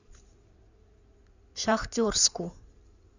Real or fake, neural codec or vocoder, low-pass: fake; codec, 16 kHz in and 24 kHz out, 2.2 kbps, FireRedTTS-2 codec; 7.2 kHz